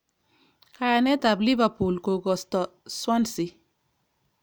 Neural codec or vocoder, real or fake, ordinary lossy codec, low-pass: none; real; none; none